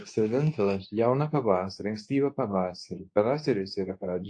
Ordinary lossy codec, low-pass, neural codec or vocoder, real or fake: AAC, 48 kbps; 9.9 kHz; codec, 24 kHz, 0.9 kbps, WavTokenizer, medium speech release version 2; fake